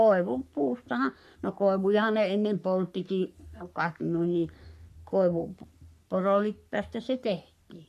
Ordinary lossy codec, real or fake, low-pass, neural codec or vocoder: none; fake; 14.4 kHz; codec, 44.1 kHz, 3.4 kbps, Pupu-Codec